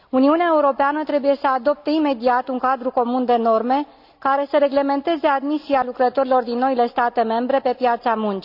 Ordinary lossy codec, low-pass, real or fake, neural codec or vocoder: none; 5.4 kHz; real; none